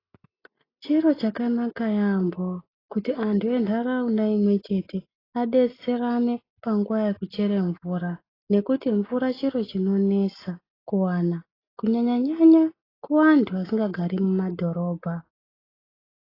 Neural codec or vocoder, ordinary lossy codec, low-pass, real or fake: none; AAC, 24 kbps; 5.4 kHz; real